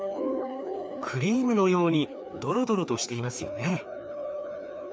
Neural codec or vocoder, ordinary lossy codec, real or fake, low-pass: codec, 16 kHz, 2 kbps, FreqCodec, larger model; none; fake; none